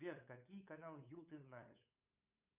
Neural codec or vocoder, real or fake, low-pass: codec, 16 kHz, 8 kbps, FunCodec, trained on LibriTTS, 25 frames a second; fake; 3.6 kHz